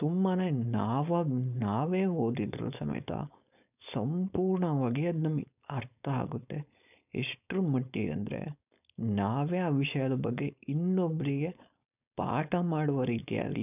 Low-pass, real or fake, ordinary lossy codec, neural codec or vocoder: 3.6 kHz; fake; none; codec, 16 kHz, 4.8 kbps, FACodec